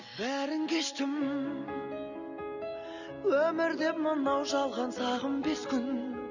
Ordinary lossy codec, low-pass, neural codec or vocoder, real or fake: none; 7.2 kHz; none; real